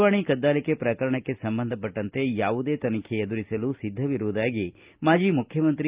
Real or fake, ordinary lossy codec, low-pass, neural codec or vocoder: real; Opus, 24 kbps; 3.6 kHz; none